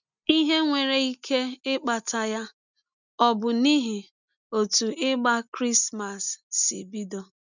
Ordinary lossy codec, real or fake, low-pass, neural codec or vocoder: none; real; 7.2 kHz; none